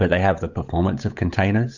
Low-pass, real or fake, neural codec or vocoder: 7.2 kHz; fake; codec, 16 kHz, 8 kbps, FunCodec, trained on Chinese and English, 25 frames a second